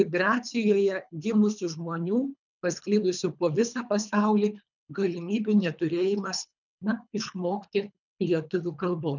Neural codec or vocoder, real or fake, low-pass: codec, 24 kHz, 3 kbps, HILCodec; fake; 7.2 kHz